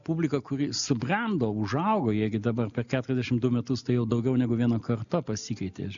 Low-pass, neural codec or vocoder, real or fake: 7.2 kHz; none; real